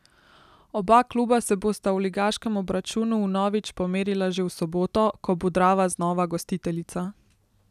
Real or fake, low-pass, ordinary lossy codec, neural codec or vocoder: real; 14.4 kHz; none; none